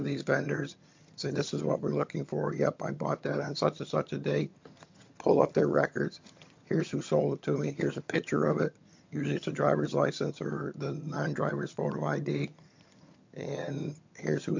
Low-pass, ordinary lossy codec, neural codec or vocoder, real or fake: 7.2 kHz; MP3, 48 kbps; vocoder, 22.05 kHz, 80 mel bands, HiFi-GAN; fake